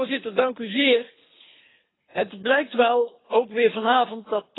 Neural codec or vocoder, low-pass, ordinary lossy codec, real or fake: codec, 24 kHz, 3 kbps, HILCodec; 7.2 kHz; AAC, 16 kbps; fake